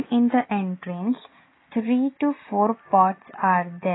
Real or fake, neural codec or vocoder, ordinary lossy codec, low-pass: real; none; AAC, 16 kbps; 7.2 kHz